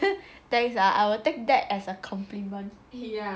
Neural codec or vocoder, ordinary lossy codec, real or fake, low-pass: none; none; real; none